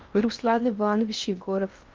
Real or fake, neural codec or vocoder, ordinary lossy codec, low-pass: fake; codec, 16 kHz in and 24 kHz out, 0.6 kbps, FocalCodec, streaming, 4096 codes; Opus, 32 kbps; 7.2 kHz